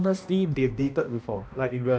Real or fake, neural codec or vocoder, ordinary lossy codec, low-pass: fake; codec, 16 kHz, 1 kbps, X-Codec, HuBERT features, trained on balanced general audio; none; none